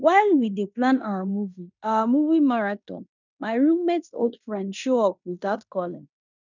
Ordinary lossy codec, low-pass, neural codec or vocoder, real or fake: none; 7.2 kHz; codec, 16 kHz in and 24 kHz out, 0.9 kbps, LongCat-Audio-Codec, fine tuned four codebook decoder; fake